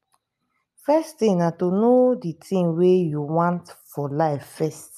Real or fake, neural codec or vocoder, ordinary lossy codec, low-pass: real; none; none; 14.4 kHz